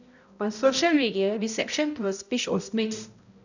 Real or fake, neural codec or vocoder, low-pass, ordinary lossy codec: fake; codec, 16 kHz, 0.5 kbps, X-Codec, HuBERT features, trained on balanced general audio; 7.2 kHz; none